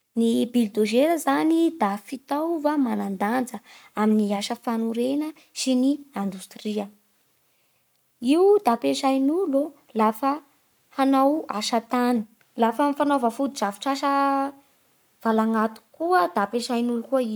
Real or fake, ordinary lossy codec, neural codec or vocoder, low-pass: fake; none; codec, 44.1 kHz, 7.8 kbps, Pupu-Codec; none